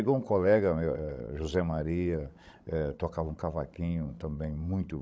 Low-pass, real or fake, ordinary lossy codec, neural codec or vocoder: none; fake; none; codec, 16 kHz, 16 kbps, FreqCodec, larger model